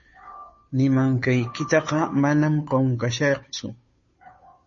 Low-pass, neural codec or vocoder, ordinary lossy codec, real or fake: 7.2 kHz; codec, 16 kHz, 2 kbps, FunCodec, trained on Chinese and English, 25 frames a second; MP3, 32 kbps; fake